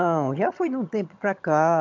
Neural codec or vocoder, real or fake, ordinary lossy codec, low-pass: vocoder, 22.05 kHz, 80 mel bands, HiFi-GAN; fake; MP3, 64 kbps; 7.2 kHz